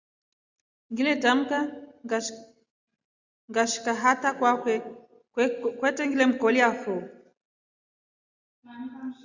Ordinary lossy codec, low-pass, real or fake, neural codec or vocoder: Opus, 64 kbps; 7.2 kHz; real; none